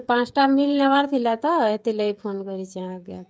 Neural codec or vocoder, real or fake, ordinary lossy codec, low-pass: codec, 16 kHz, 16 kbps, FreqCodec, smaller model; fake; none; none